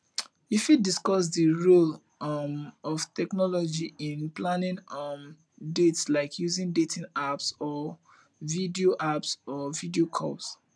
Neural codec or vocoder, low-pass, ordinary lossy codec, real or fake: none; none; none; real